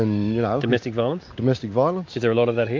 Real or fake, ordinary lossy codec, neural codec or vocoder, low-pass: real; MP3, 48 kbps; none; 7.2 kHz